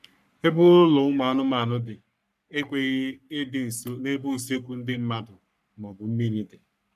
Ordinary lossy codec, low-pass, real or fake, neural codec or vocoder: none; 14.4 kHz; fake; codec, 44.1 kHz, 3.4 kbps, Pupu-Codec